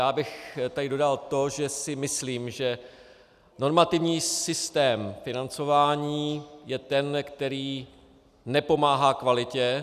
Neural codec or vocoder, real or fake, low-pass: none; real; 14.4 kHz